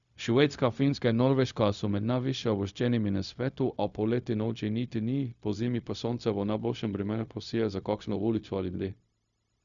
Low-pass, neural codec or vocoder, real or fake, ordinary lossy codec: 7.2 kHz; codec, 16 kHz, 0.4 kbps, LongCat-Audio-Codec; fake; none